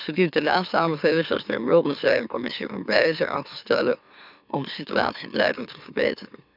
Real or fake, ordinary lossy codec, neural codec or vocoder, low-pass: fake; none; autoencoder, 44.1 kHz, a latent of 192 numbers a frame, MeloTTS; 5.4 kHz